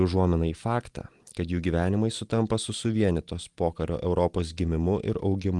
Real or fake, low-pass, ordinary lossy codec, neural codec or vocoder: real; 10.8 kHz; Opus, 24 kbps; none